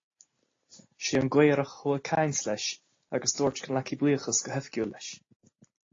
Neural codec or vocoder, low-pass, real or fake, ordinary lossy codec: none; 7.2 kHz; real; AAC, 32 kbps